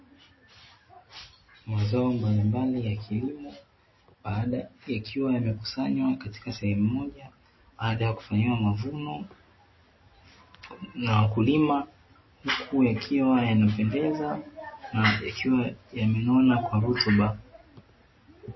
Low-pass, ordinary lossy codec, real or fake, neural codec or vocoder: 7.2 kHz; MP3, 24 kbps; real; none